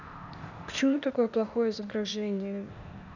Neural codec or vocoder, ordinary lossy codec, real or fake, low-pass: codec, 16 kHz, 0.8 kbps, ZipCodec; none; fake; 7.2 kHz